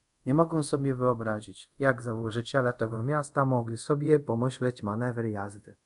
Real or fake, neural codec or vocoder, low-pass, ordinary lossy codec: fake; codec, 24 kHz, 0.5 kbps, DualCodec; 10.8 kHz; AAC, 96 kbps